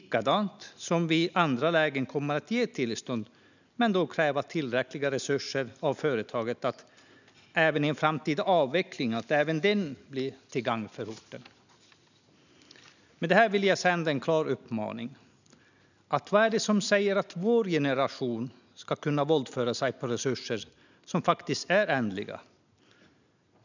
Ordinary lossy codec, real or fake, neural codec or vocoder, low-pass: none; real; none; 7.2 kHz